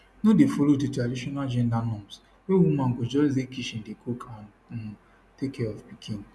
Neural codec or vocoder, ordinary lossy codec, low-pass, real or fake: none; none; none; real